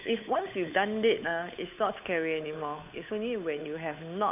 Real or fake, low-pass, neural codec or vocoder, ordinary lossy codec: fake; 3.6 kHz; codec, 16 kHz, 8 kbps, FunCodec, trained on Chinese and English, 25 frames a second; none